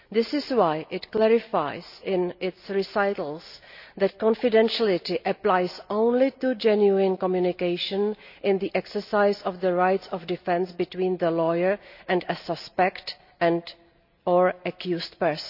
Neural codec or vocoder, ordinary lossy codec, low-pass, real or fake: none; none; 5.4 kHz; real